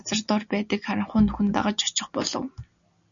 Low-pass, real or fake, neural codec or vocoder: 7.2 kHz; real; none